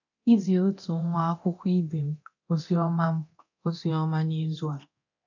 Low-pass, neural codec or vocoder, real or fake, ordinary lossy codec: 7.2 kHz; codec, 24 kHz, 0.9 kbps, DualCodec; fake; none